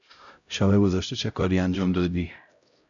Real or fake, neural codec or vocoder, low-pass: fake; codec, 16 kHz, 0.5 kbps, X-Codec, HuBERT features, trained on LibriSpeech; 7.2 kHz